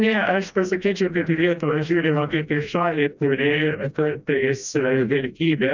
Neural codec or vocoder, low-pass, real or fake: codec, 16 kHz, 1 kbps, FreqCodec, smaller model; 7.2 kHz; fake